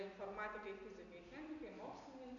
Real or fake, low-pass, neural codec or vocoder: real; 7.2 kHz; none